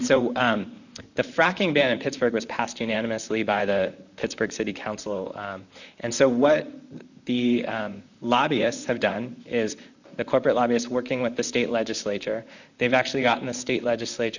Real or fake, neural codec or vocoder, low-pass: fake; vocoder, 44.1 kHz, 128 mel bands, Pupu-Vocoder; 7.2 kHz